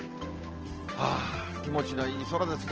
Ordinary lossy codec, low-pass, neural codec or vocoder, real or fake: Opus, 16 kbps; 7.2 kHz; none; real